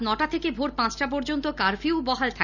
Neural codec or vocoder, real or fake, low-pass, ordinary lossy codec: none; real; 7.2 kHz; none